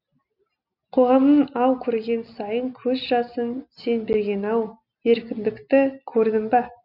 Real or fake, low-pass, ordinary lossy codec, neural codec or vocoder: real; 5.4 kHz; none; none